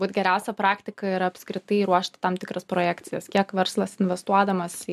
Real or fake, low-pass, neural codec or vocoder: real; 14.4 kHz; none